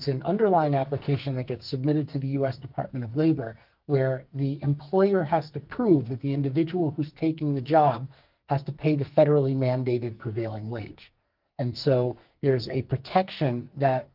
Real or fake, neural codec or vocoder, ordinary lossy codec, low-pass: fake; codec, 44.1 kHz, 2.6 kbps, SNAC; Opus, 32 kbps; 5.4 kHz